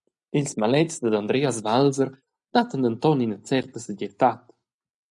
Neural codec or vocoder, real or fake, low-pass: none; real; 10.8 kHz